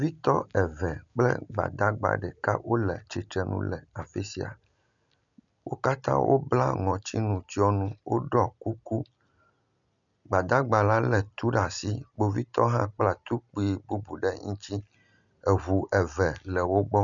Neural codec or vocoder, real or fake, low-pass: none; real; 7.2 kHz